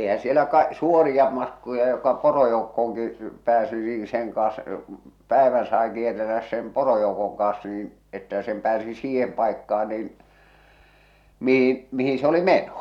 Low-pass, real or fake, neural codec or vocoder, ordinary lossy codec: 19.8 kHz; real; none; none